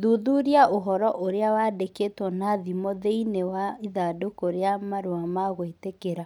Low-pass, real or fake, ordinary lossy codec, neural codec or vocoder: 19.8 kHz; real; none; none